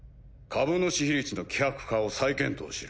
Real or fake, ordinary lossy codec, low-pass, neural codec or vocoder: real; none; none; none